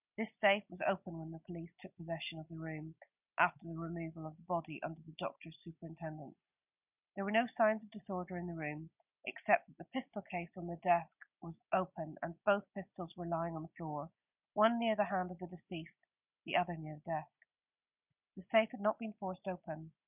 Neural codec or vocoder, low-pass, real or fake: none; 3.6 kHz; real